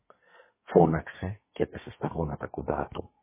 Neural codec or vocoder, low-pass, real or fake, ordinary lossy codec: codec, 32 kHz, 1.9 kbps, SNAC; 3.6 kHz; fake; MP3, 16 kbps